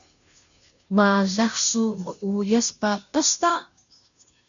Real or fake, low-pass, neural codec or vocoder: fake; 7.2 kHz; codec, 16 kHz, 0.5 kbps, FunCodec, trained on Chinese and English, 25 frames a second